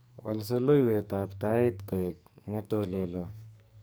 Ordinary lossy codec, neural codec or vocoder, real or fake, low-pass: none; codec, 44.1 kHz, 2.6 kbps, SNAC; fake; none